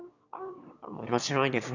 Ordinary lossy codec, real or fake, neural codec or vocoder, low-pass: none; fake; autoencoder, 22.05 kHz, a latent of 192 numbers a frame, VITS, trained on one speaker; 7.2 kHz